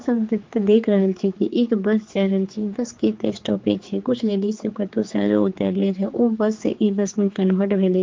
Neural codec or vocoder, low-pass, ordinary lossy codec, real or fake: codec, 16 kHz, 4 kbps, X-Codec, HuBERT features, trained on general audio; 7.2 kHz; Opus, 32 kbps; fake